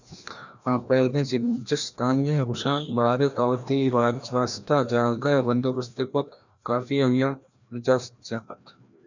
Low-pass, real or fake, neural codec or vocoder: 7.2 kHz; fake; codec, 16 kHz, 1 kbps, FreqCodec, larger model